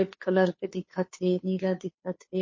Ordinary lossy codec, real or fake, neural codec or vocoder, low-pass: MP3, 32 kbps; fake; codec, 16 kHz, 2 kbps, FunCodec, trained on Chinese and English, 25 frames a second; 7.2 kHz